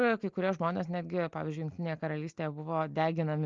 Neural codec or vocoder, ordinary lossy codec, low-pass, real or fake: none; Opus, 24 kbps; 7.2 kHz; real